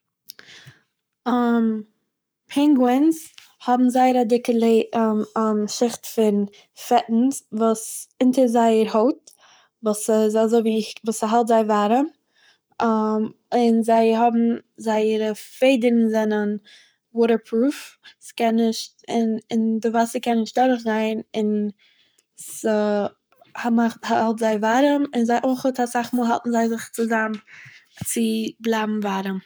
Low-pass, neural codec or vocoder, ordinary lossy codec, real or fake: none; codec, 44.1 kHz, 7.8 kbps, Pupu-Codec; none; fake